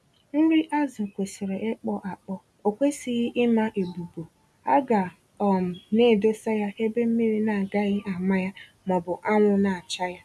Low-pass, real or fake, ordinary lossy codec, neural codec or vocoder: none; real; none; none